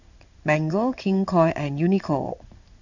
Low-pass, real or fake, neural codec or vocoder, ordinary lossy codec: 7.2 kHz; fake; codec, 16 kHz in and 24 kHz out, 1 kbps, XY-Tokenizer; none